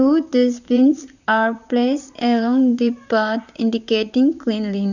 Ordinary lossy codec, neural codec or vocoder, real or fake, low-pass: none; vocoder, 44.1 kHz, 128 mel bands, Pupu-Vocoder; fake; 7.2 kHz